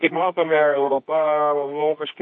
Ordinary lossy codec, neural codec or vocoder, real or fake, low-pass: MP3, 32 kbps; codec, 24 kHz, 0.9 kbps, WavTokenizer, medium music audio release; fake; 9.9 kHz